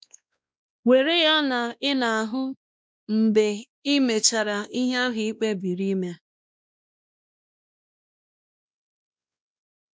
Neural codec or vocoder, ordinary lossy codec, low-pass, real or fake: codec, 16 kHz, 1 kbps, X-Codec, WavLM features, trained on Multilingual LibriSpeech; none; none; fake